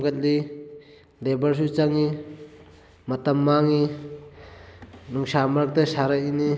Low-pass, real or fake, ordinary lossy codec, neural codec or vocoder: none; real; none; none